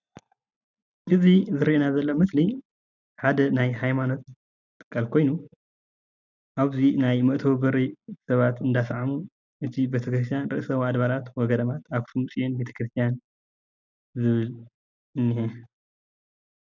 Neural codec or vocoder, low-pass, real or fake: none; 7.2 kHz; real